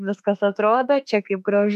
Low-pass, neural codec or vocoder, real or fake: 14.4 kHz; autoencoder, 48 kHz, 32 numbers a frame, DAC-VAE, trained on Japanese speech; fake